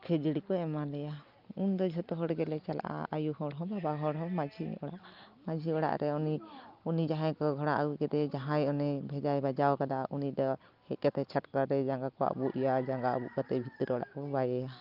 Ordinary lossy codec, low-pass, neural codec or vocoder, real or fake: Opus, 32 kbps; 5.4 kHz; none; real